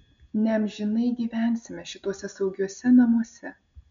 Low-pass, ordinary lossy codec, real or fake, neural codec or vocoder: 7.2 kHz; MP3, 64 kbps; real; none